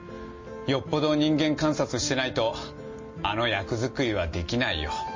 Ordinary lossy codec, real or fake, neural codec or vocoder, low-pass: MP3, 32 kbps; real; none; 7.2 kHz